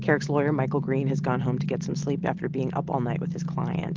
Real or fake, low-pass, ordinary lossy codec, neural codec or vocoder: real; 7.2 kHz; Opus, 16 kbps; none